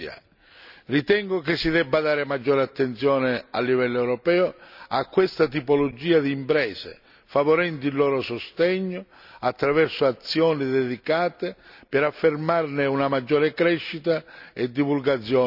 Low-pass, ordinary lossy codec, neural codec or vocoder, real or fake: 5.4 kHz; none; none; real